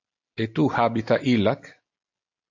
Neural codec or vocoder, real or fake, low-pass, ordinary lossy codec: none; real; 7.2 kHz; AAC, 48 kbps